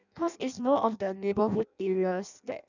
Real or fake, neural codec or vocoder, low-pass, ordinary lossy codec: fake; codec, 16 kHz in and 24 kHz out, 0.6 kbps, FireRedTTS-2 codec; 7.2 kHz; none